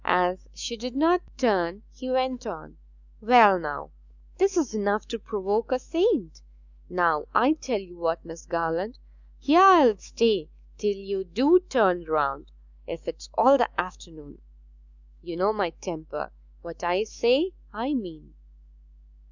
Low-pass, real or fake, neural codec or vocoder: 7.2 kHz; fake; autoencoder, 48 kHz, 128 numbers a frame, DAC-VAE, trained on Japanese speech